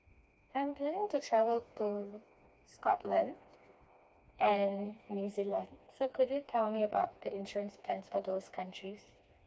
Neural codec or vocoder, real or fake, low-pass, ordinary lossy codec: codec, 16 kHz, 2 kbps, FreqCodec, smaller model; fake; none; none